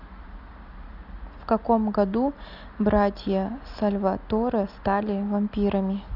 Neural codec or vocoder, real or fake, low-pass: none; real; 5.4 kHz